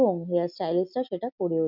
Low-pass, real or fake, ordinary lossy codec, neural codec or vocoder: 5.4 kHz; real; none; none